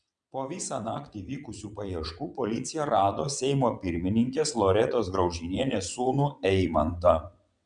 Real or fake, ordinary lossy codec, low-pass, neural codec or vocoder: fake; MP3, 96 kbps; 9.9 kHz; vocoder, 22.05 kHz, 80 mel bands, WaveNeXt